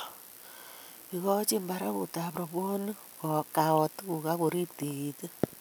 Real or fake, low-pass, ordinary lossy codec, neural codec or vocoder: real; none; none; none